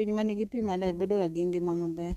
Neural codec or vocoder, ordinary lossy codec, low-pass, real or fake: codec, 32 kHz, 1.9 kbps, SNAC; none; 14.4 kHz; fake